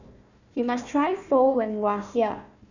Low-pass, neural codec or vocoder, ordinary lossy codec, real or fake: 7.2 kHz; codec, 16 kHz, 1 kbps, FunCodec, trained on Chinese and English, 50 frames a second; none; fake